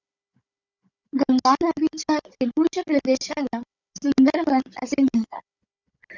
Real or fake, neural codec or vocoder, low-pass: fake; codec, 16 kHz, 16 kbps, FunCodec, trained on Chinese and English, 50 frames a second; 7.2 kHz